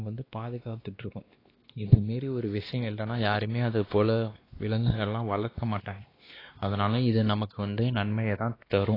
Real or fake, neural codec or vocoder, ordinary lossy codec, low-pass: fake; codec, 16 kHz, 2 kbps, X-Codec, WavLM features, trained on Multilingual LibriSpeech; AAC, 24 kbps; 5.4 kHz